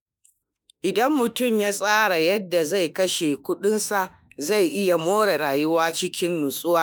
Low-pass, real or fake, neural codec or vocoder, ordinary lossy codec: none; fake; autoencoder, 48 kHz, 32 numbers a frame, DAC-VAE, trained on Japanese speech; none